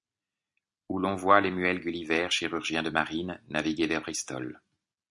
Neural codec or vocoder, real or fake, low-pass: none; real; 10.8 kHz